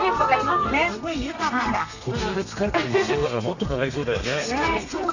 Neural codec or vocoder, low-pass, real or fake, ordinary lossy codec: codec, 32 kHz, 1.9 kbps, SNAC; 7.2 kHz; fake; none